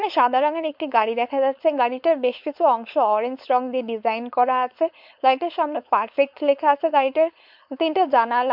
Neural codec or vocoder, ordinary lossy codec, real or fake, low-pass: codec, 16 kHz, 4.8 kbps, FACodec; MP3, 48 kbps; fake; 5.4 kHz